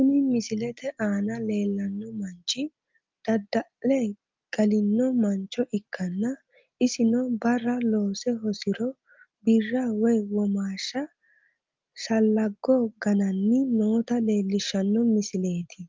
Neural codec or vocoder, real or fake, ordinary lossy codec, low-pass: none; real; Opus, 32 kbps; 7.2 kHz